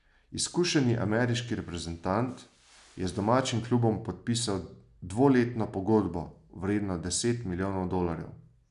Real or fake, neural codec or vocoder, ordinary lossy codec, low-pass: real; none; none; 10.8 kHz